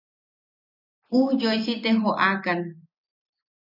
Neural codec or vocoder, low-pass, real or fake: none; 5.4 kHz; real